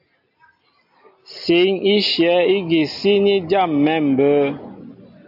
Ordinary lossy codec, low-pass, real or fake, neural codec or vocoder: AAC, 48 kbps; 5.4 kHz; real; none